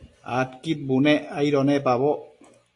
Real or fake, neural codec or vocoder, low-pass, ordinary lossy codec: real; none; 10.8 kHz; AAC, 48 kbps